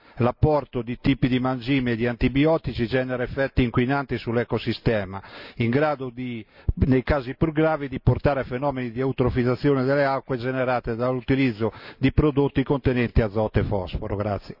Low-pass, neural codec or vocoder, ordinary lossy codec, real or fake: 5.4 kHz; none; none; real